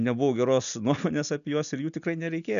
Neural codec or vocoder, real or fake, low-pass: none; real; 7.2 kHz